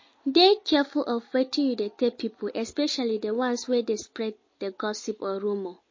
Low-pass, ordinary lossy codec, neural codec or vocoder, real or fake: 7.2 kHz; MP3, 32 kbps; none; real